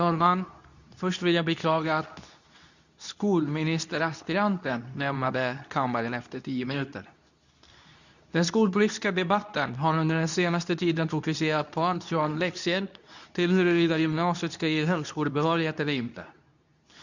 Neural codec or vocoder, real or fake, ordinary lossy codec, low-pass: codec, 24 kHz, 0.9 kbps, WavTokenizer, medium speech release version 2; fake; none; 7.2 kHz